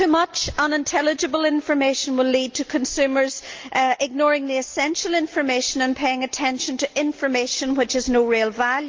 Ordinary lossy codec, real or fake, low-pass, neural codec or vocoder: Opus, 24 kbps; real; 7.2 kHz; none